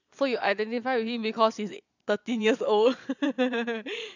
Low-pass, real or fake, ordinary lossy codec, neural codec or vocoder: 7.2 kHz; fake; none; vocoder, 22.05 kHz, 80 mel bands, Vocos